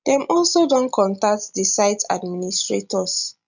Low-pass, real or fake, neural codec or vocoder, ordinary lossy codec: 7.2 kHz; real; none; none